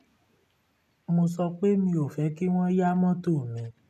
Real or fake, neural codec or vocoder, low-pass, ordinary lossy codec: real; none; 14.4 kHz; none